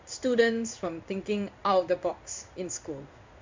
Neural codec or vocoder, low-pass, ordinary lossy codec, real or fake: codec, 16 kHz in and 24 kHz out, 1 kbps, XY-Tokenizer; 7.2 kHz; MP3, 64 kbps; fake